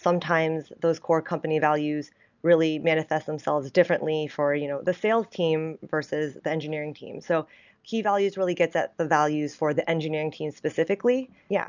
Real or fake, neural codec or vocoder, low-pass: real; none; 7.2 kHz